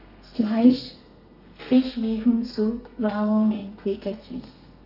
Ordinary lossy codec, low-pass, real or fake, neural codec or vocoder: none; 5.4 kHz; fake; codec, 32 kHz, 1.9 kbps, SNAC